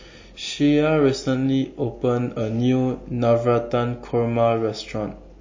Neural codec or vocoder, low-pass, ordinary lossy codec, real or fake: none; 7.2 kHz; MP3, 32 kbps; real